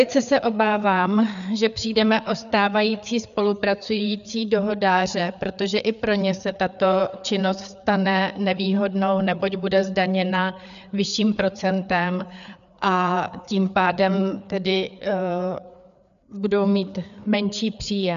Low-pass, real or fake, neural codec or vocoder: 7.2 kHz; fake; codec, 16 kHz, 4 kbps, FreqCodec, larger model